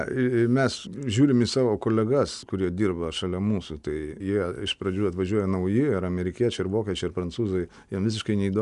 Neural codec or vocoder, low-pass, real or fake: none; 10.8 kHz; real